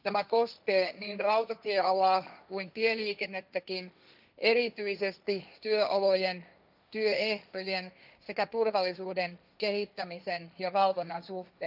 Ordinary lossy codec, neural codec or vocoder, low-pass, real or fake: none; codec, 16 kHz, 1.1 kbps, Voila-Tokenizer; 5.4 kHz; fake